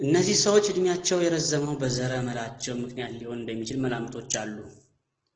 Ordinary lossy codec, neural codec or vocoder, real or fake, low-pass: Opus, 16 kbps; none; real; 7.2 kHz